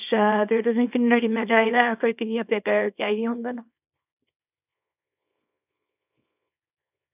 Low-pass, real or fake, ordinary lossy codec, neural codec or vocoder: 3.6 kHz; fake; none; codec, 24 kHz, 0.9 kbps, WavTokenizer, small release